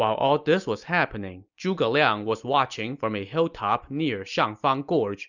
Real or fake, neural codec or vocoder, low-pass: real; none; 7.2 kHz